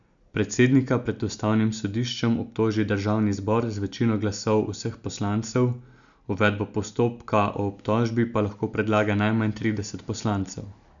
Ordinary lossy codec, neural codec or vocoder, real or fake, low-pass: none; none; real; 7.2 kHz